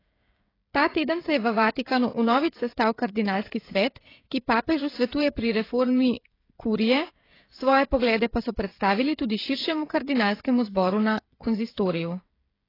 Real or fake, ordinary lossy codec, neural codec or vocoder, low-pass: fake; AAC, 24 kbps; codec, 16 kHz, 16 kbps, FreqCodec, smaller model; 5.4 kHz